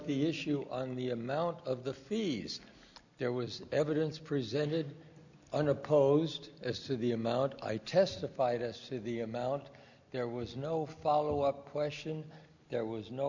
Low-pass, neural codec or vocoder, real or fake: 7.2 kHz; none; real